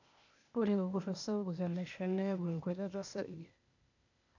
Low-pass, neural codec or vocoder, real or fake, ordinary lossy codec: 7.2 kHz; codec, 16 kHz, 0.8 kbps, ZipCodec; fake; none